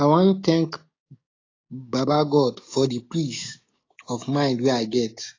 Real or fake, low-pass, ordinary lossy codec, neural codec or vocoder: fake; 7.2 kHz; AAC, 32 kbps; codec, 16 kHz, 6 kbps, DAC